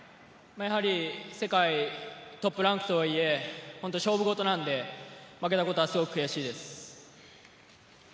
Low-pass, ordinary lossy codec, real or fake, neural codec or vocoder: none; none; real; none